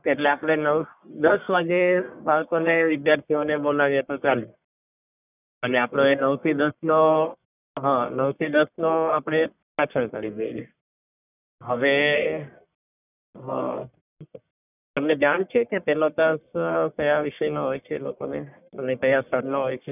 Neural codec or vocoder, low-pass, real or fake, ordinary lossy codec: codec, 44.1 kHz, 1.7 kbps, Pupu-Codec; 3.6 kHz; fake; none